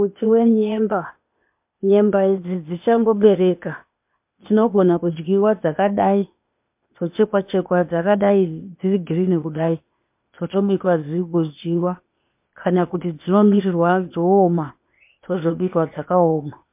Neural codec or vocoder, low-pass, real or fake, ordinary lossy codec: codec, 16 kHz, 0.8 kbps, ZipCodec; 3.6 kHz; fake; MP3, 32 kbps